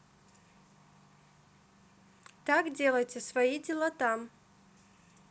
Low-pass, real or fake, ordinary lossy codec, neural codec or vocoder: none; real; none; none